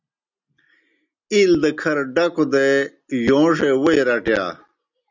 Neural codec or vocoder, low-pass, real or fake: none; 7.2 kHz; real